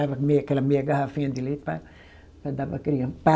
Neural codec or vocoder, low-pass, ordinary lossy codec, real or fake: none; none; none; real